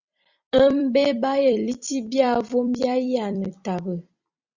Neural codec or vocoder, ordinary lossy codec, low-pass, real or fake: vocoder, 44.1 kHz, 128 mel bands every 256 samples, BigVGAN v2; Opus, 64 kbps; 7.2 kHz; fake